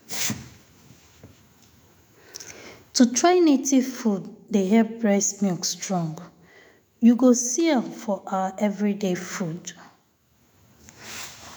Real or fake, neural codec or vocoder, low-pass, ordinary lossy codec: fake; autoencoder, 48 kHz, 128 numbers a frame, DAC-VAE, trained on Japanese speech; none; none